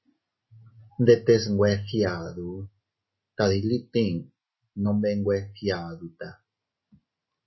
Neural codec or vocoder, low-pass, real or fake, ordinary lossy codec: none; 7.2 kHz; real; MP3, 24 kbps